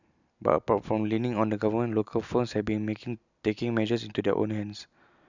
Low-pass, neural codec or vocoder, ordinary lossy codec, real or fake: 7.2 kHz; none; none; real